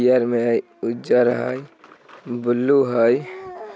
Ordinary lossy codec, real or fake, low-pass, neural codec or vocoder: none; real; none; none